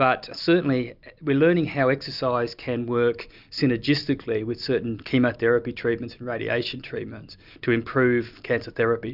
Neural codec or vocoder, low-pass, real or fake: none; 5.4 kHz; real